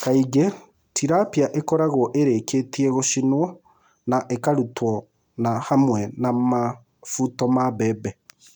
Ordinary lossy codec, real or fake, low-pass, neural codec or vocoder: none; real; 19.8 kHz; none